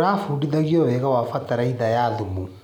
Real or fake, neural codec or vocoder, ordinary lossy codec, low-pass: real; none; none; 19.8 kHz